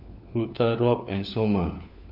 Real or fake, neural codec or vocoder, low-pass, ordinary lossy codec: fake; codec, 16 kHz, 4 kbps, FunCodec, trained on LibriTTS, 50 frames a second; 5.4 kHz; none